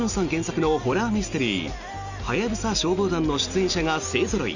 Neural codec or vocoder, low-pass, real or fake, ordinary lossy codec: none; 7.2 kHz; real; none